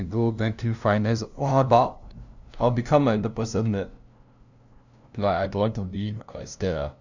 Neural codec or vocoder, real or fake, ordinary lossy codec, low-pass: codec, 16 kHz, 0.5 kbps, FunCodec, trained on LibriTTS, 25 frames a second; fake; none; 7.2 kHz